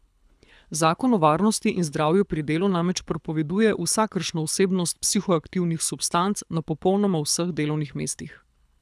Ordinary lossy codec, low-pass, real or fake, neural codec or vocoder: none; none; fake; codec, 24 kHz, 6 kbps, HILCodec